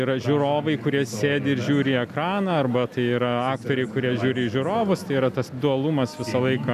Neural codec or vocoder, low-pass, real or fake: none; 14.4 kHz; real